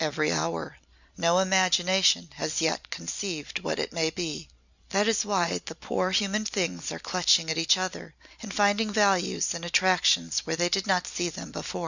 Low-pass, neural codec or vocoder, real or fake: 7.2 kHz; none; real